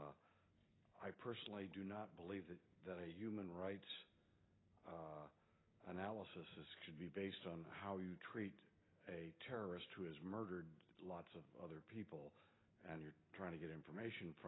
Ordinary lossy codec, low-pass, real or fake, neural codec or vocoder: AAC, 16 kbps; 7.2 kHz; real; none